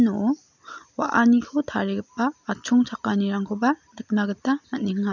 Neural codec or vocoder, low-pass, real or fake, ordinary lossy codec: none; 7.2 kHz; real; none